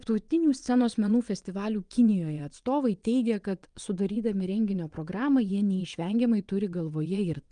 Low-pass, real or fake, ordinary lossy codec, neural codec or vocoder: 9.9 kHz; fake; Opus, 32 kbps; vocoder, 22.05 kHz, 80 mel bands, WaveNeXt